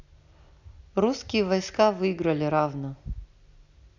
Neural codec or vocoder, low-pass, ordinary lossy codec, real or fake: none; 7.2 kHz; none; real